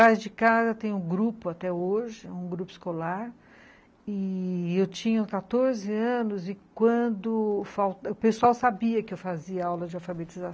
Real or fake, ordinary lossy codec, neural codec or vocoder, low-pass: real; none; none; none